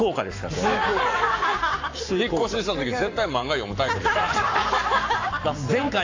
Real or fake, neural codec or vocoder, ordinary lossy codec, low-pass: fake; codec, 44.1 kHz, 7.8 kbps, Pupu-Codec; AAC, 48 kbps; 7.2 kHz